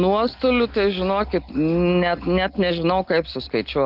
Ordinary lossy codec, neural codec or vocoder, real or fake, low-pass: Opus, 32 kbps; none; real; 5.4 kHz